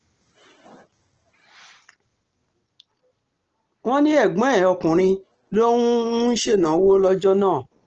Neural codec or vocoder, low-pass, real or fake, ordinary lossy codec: none; 7.2 kHz; real; Opus, 16 kbps